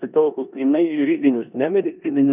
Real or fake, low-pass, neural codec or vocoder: fake; 3.6 kHz; codec, 16 kHz in and 24 kHz out, 0.9 kbps, LongCat-Audio-Codec, four codebook decoder